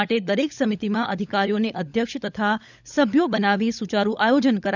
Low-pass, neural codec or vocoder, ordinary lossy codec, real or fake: 7.2 kHz; codec, 16 kHz, 16 kbps, FunCodec, trained on LibriTTS, 50 frames a second; none; fake